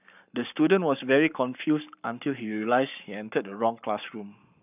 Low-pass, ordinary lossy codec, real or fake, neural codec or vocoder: 3.6 kHz; none; fake; codec, 44.1 kHz, 7.8 kbps, Pupu-Codec